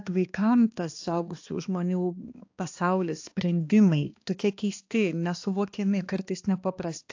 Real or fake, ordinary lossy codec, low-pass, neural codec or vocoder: fake; AAC, 48 kbps; 7.2 kHz; codec, 16 kHz, 2 kbps, X-Codec, HuBERT features, trained on balanced general audio